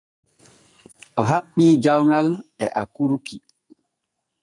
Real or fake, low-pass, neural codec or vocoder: fake; 10.8 kHz; codec, 44.1 kHz, 2.6 kbps, SNAC